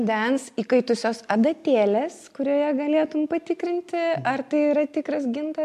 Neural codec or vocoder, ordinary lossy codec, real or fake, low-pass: none; MP3, 64 kbps; real; 14.4 kHz